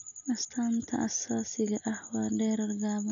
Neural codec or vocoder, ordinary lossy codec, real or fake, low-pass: none; none; real; 7.2 kHz